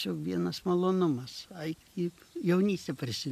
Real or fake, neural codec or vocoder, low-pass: real; none; 14.4 kHz